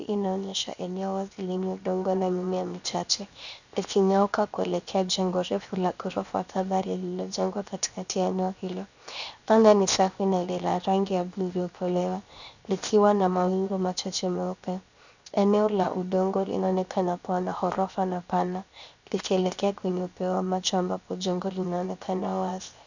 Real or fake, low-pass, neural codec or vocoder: fake; 7.2 kHz; codec, 16 kHz, 0.7 kbps, FocalCodec